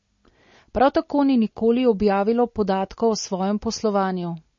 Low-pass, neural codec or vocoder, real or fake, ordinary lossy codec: 7.2 kHz; none; real; MP3, 32 kbps